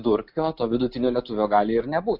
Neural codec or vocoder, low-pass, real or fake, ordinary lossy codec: none; 5.4 kHz; real; MP3, 48 kbps